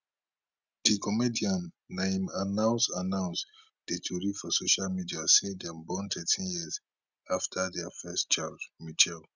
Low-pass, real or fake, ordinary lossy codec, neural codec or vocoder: 7.2 kHz; real; Opus, 64 kbps; none